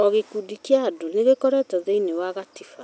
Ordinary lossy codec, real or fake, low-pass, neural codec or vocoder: none; real; none; none